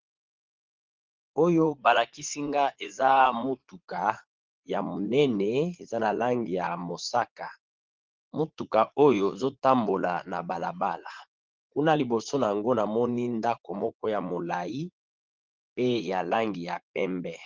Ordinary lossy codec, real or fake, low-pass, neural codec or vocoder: Opus, 16 kbps; fake; 7.2 kHz; vocoder, 44.1 kHz, 80 mel bands, Vocos